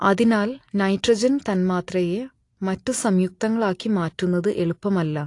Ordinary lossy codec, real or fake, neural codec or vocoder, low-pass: AAC, 48 kbps; real; none; 10.8 kHz